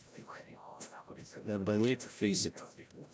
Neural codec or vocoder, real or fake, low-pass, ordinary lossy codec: codec, 16 kHz, 0.5 kbps, FreqCodec, larger model; fake; none; none